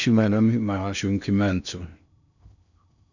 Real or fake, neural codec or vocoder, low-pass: fake; codec, 16 kHz in and 24 kHz out, 0.6 kbps, FocalCodec, streaming, 2048 codes; 7.2 kHz